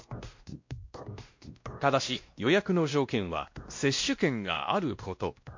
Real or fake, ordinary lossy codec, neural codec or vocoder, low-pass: fake; MP3, 48 kbps; codec, 16 kHz, 1 kbps, X-Codec, WavLM features, trained on Multilingual LibriSpeech; 7.2 kHz